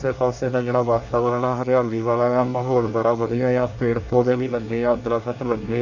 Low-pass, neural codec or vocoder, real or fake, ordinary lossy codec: 7.2 kHz; codec, 24 kHz, 1 kbps, SNAC; fake; none